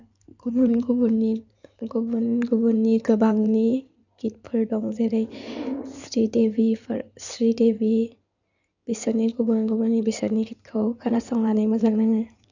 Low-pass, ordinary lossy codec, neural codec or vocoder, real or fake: 7.2 kHz; none; codec, 16 kHz in and 24 kHz out, 2.2 kbps, FireRedTTS-2 codec; fake